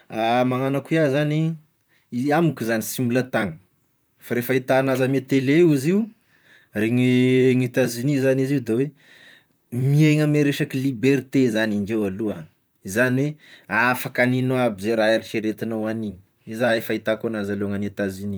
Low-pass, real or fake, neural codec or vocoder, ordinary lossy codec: none; fake; vocoder, 44.1 kHz, 128 mel bands, Pupu-Vocoder; none